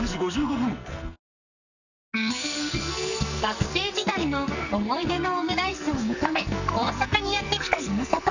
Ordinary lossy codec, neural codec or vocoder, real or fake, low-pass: none; codec, 44.1 kHz, 2.6 kbps, SNAC; fake; 7.2 kHz